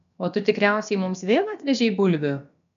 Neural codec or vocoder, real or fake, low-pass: codec, 16 kHz, about 1 kbps, DyCAST, with the encoder's durations; fake; 7.2 kHz